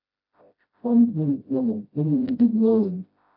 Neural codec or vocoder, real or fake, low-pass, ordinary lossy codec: codec, 16 kHz, 0.5 kbps, FreqCodec, smaller model; fake; 5.4 kHz; AAC, 48 kbps